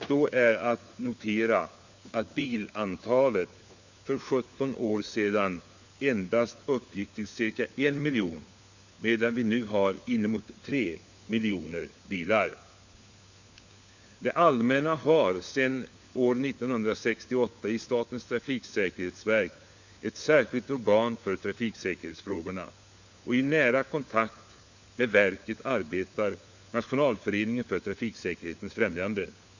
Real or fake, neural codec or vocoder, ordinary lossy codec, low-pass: fake; codec, 16 kHz, 4 kbps, FunCodec, trained on LibriTTS, 50 frames a second; Opus, 64 kbps; 7.2 kHz